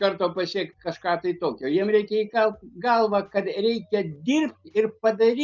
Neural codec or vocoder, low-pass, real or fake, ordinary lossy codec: none; 7.2 kHz; real; Opus, 24 kbps